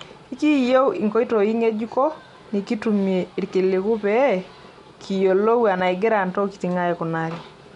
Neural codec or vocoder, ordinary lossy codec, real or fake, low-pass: none; MP3, 64 kbps; real; 10.8 kHz